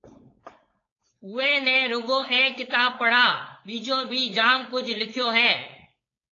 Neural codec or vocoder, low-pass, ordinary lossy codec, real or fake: codec, 16 kHz, 4.8 kbps, FACodec; 7.2 kHz; AAC, 32 kbps; fake